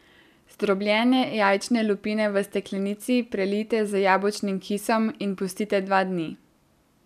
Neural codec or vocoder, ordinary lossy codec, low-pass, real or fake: none; none; 14.4 kHz; real